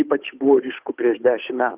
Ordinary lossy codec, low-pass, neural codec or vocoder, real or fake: Opus, 24 kbps; 3.6 kHz; codec, 16 kHz, 8 kbps, FunCodec, trained on Chinese and English, 25 frames a second; fake